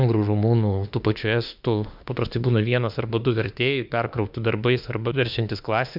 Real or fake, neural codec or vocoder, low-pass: fake; autoencoder, 48 kHz, 32 numbers a frame, DAC-VAE, trained on Japanese speech; 5.4 kHz